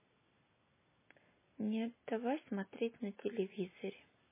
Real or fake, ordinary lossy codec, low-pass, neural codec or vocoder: real; MP3, 16 kbps; 3.6 kHz; none